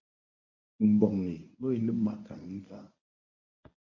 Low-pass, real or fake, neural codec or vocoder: 7.2 kHz; fake; codec, 24 kHz, 0.9 kbps, WavTokenizer, medium speech release version 1